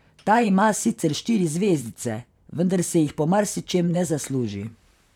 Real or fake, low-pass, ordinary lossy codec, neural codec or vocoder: fake; 19.8 kHz; none; vocoder, 44.1 kHz, 128 mel bands, Pupu-Vocoder